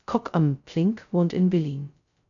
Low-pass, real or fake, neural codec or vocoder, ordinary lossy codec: 7.2 kHz; fake; codec, 16 kHz, 0.2 kbps, FocalCodec; MP3, 96 kbps